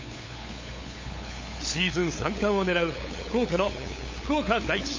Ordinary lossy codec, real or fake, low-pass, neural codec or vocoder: MP3, 32 kbps; fake; 7.2 kHz; codec, 16 kHz, 8 kbps, FunCodec, trained on LibriTTS, 25 frames a second